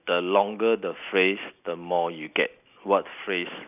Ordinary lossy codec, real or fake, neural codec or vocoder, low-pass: none; real; none; 3.6 kHz